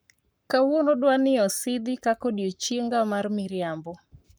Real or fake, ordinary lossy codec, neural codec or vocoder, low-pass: fake; none; codec, 44.1 kHz, 7.8 kbps, Pupu-Codec; none